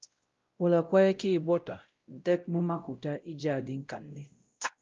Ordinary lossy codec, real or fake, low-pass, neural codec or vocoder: Opus, 32 kbps; fake; 7.2 kHz; codec, 16 kHz, 0.5 kbps, X-Codec, WavLM features, trained on Multilingual LibriSpeech